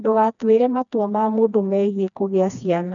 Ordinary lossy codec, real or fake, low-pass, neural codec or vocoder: none; fake; 7.2 kHz; codec, 16 kHz, 2 kbps, FreqCodec, smaller model